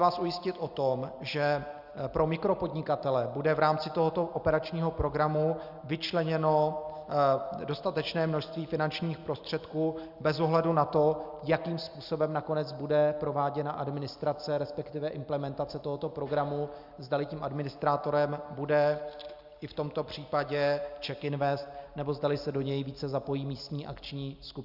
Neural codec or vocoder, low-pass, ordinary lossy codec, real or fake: none; 5.4 kHz; Opus, 64 kbps; real